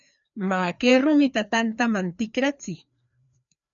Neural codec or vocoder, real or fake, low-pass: codec, 16 kHz, 2 kbps, FreqCodec, larger model; fake; 7.2 kHz